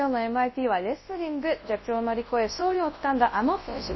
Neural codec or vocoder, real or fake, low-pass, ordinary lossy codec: codec, 24 kHz, 0.9 kbps, WavTokenizer, large speech release; fake; 7.2 kHz; MP3, 24 kbps